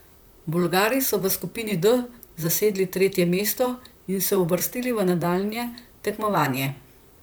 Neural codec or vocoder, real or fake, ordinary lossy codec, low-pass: vocoder, 44.1 kHz, 128 mel bands, Pupu-Vocoder; fake; none; none